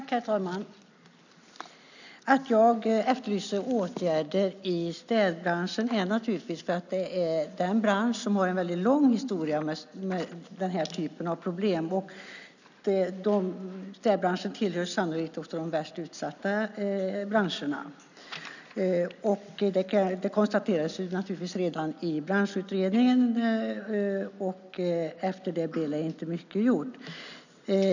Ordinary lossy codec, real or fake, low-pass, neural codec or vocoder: none; real; 7.2 kHz; none